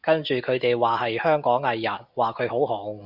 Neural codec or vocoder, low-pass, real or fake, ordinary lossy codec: none; 5.4 kHz; real; Opus, 64 kbps